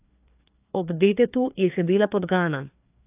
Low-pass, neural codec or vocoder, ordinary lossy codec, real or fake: 3.6 kHz; codec, 16 kHz, 2 kbps, FreqCodec, larger model; AAC, 32 kbps; fake